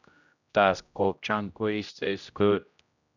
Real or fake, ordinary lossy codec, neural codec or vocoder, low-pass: fake; none; codec, 16 kHz, 0.5 kbps, X-Codec, HuBERT features, trained on general audio; 7.2 kHz